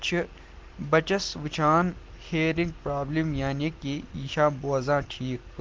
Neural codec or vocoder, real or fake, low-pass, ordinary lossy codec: none; real; 7.2 kHz; Opus, 16 kbps